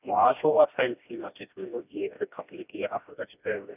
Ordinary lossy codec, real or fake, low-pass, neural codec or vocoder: none; fake; 3.6 kHz; codec, 16 kHz, 1 kbps, FreqCodec, smaller model